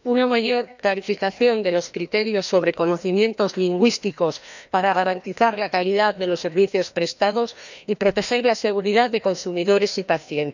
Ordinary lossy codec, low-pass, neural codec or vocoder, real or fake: none; 7.2 kHz; codec, 16 kHz, 1 kbps, FreqCodec, larger model; fake